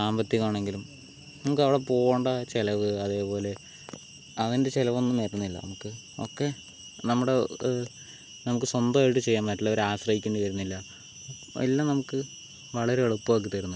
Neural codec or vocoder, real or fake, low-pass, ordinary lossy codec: none; real; none; none